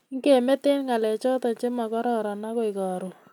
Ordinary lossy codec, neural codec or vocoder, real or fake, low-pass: none; none; real; 19.8 kHz